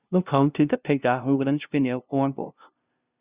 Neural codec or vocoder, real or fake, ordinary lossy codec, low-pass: codec, 16 kHz, 0.5 kbps, FunCodec, trained on LibriTTS, 25 frames a second; fake; Opus, 64 kbps; 3.6 kHz